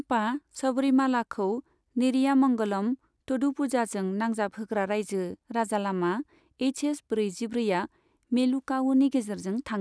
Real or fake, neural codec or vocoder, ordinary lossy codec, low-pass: real; none; none; none